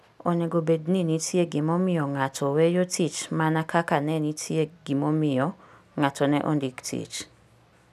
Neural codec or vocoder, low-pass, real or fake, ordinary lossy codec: none; 14.4 kHz; real; none